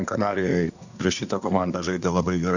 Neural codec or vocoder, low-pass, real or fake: codec, 24 kHz, 1 kbps, SNAC; 7.2 kHz; fake